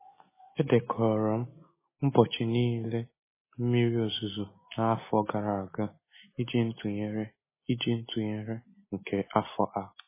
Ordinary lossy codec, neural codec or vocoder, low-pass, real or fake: MP3, 16 kbps; none; 3.6 kHz; real